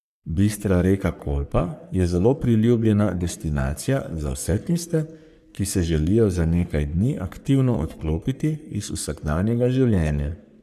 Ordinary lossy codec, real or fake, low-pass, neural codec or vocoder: none; fake; 14.4 kHz; codec, 44.1 kHz, 3.4 kbps, Pupu-Codec